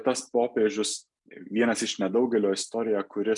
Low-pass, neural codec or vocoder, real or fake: 10.8 kHz; none; real